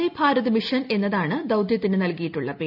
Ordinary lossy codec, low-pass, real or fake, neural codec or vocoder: none; 5.4 kHz; real; none